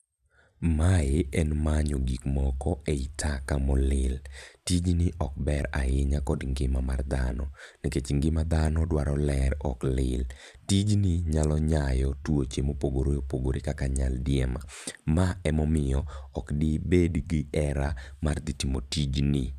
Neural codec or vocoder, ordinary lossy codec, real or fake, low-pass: vocoder, 44.1 kHz, 128 mel bands every 512 samples, BigVGAN v2; none; fake; 14.4 kHz